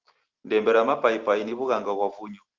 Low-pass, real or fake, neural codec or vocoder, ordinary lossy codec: 7.2 kHz; real; none; Opus, 16 kbps